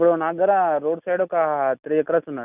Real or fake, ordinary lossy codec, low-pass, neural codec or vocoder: real; none; 3.6 kHz; none